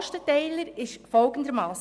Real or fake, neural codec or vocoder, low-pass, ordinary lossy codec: real; none; none; none